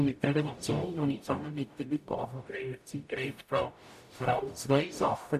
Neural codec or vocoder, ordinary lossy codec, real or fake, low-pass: codec, 44.1 kHz, 0.9 kbps, DAC; none; fake; 14.4 kHz